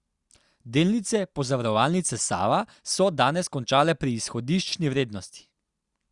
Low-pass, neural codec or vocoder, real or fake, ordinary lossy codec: 10.8 kHz; none; real; Opus, 64 kbps